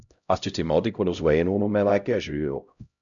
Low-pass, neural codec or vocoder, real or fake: 7.2 kHz; codec, 16 kHz, 0.5 kbps, X-Codec, HuBERT features, trained on LibriSpeech; fake